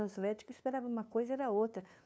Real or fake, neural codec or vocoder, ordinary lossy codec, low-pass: fake; codec, 16 kHz, 2 kbps, FunCodec, trained on LibriTTS, 25 frames a second; none; none